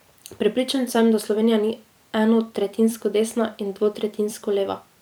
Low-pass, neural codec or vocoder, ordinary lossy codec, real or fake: none; vocoder, 44.1 kHz, 128 mel bands every 256 samples, BigVGAN v2; none; fake